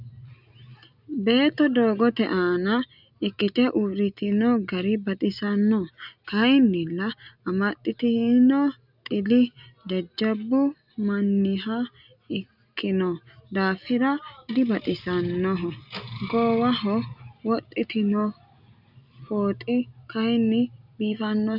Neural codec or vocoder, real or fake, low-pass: none; real; 5.4 kHz